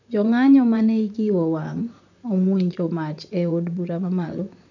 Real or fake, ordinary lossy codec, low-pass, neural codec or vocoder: fake; none; 7.2 kHz; vocoder, 44.1 kHz, 128 mel bands, Pupu-Vocoder